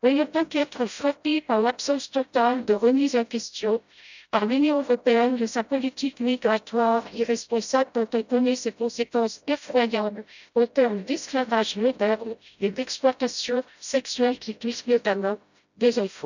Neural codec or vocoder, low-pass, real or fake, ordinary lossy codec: codec, 16 kHz, 0.5 kbps, FreqCodec, smaller model; 7.2 kHz; fake; none